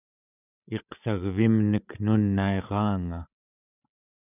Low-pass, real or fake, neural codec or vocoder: 3.6 kHz; fake; codec, 16 kHz, 16 kbps, FreqCodec, larger model